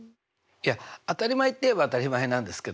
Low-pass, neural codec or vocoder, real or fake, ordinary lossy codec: none; none; real; none